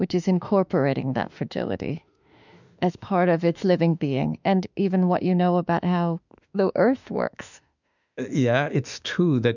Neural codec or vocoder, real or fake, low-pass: autoencoder, 48 kHz, 32 numbers a frame, DAC-VAE, trained on Japanese speech; fake; 7.2 kHz